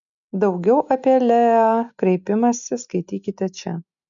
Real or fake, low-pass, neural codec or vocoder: real; 7.2 kHz; none